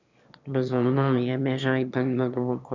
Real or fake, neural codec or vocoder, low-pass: fake; autoencoder, 22.05 kHz, a latent of 192 numbers a frame, VITS, trained on one speaker; 7.2 kHz